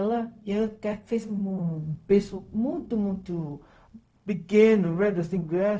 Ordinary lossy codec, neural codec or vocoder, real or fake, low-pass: none; codec, 16 kHz, 0.4 kbps, LongCat-Audio-Codec; fake; none